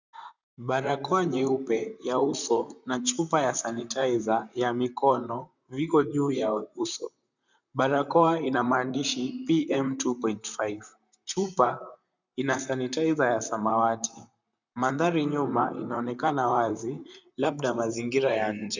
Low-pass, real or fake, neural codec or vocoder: 7.2 kHz; fake; vocoder, 44.1 kHz, 128 mel bands, Pupu-Vocoder